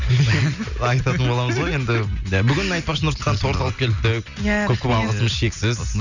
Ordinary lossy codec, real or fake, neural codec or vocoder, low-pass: none; real; none; 7.2 kHz